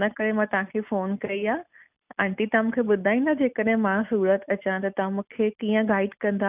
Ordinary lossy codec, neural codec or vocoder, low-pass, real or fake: none; none; 3.6 kHz; real